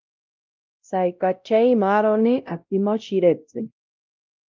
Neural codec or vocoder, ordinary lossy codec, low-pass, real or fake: codec, 16 kHz, 0.5 kbps, X-Codec, WavLM features, trained on Multilingual LibriSpeech; Opus, 32 kbps; 7.2 kHz; fake